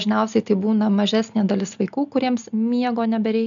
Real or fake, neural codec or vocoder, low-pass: real; none; 7.2 kHz